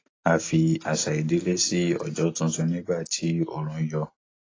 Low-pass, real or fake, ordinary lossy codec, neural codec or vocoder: 7.2 kHz; real; AAC, 32 kbps; none